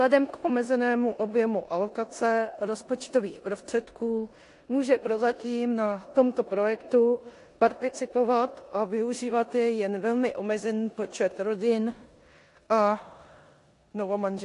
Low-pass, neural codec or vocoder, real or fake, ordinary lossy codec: 10.8 kHz; codec, 16 kHz in and 24 kHz out, 0.9 kbps, LongCat-Audio-Codec, four codebook decoder; fake; AAC, 48 kbps